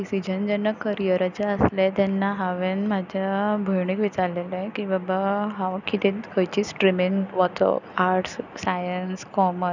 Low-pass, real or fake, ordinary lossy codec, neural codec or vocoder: 7.2 kHz; real; none; none